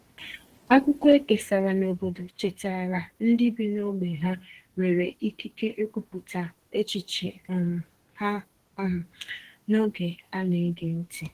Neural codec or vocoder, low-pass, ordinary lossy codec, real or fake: codec, 32 kHz, 1.9 kbps, SNAC; 14.4 kHz; Opus, 16 kbps; fake